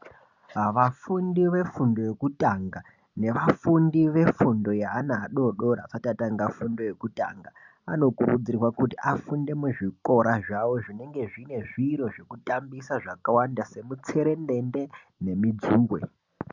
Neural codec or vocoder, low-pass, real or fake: none; 7.2 kHz; real